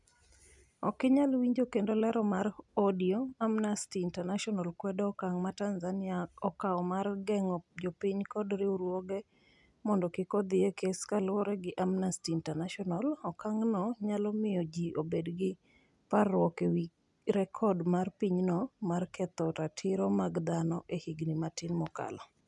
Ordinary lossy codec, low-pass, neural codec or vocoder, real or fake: none; 10.8 kHz; none; real